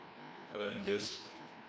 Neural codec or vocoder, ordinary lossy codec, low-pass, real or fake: codec, 16 kHz, 1 kbps, FreqCodec, larger model; none; none; fake